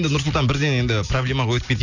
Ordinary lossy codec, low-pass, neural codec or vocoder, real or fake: none; 7.2 kHz; none; real